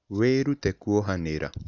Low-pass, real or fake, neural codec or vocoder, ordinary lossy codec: 7.2 kHz; real; none; none